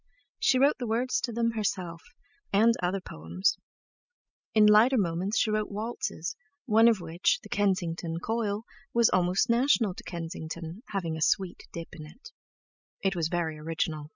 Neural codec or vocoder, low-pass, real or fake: none; 7.2 kHz; real